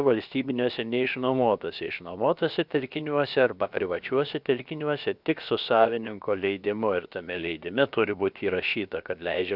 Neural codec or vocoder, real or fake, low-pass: codec, 16 kHz, about 1 kbps, DyCAST, with the encoder's durations; fake; 5.4 kHz